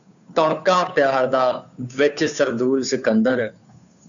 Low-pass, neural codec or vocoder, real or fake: 7.2 kHz; codec, 16 kHz, 2 kbps, FunCodec, trained on Chinese and English, 25 frames a second; fake